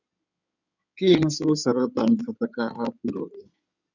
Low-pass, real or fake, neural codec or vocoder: 7.2 kHz; fake; codec, 16 kHz in and 24 kHz out, 2.2 kbps, FireRedTTS-2 codec